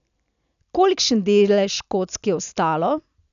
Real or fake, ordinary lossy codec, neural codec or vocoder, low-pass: real; none; none; 7.2 kHz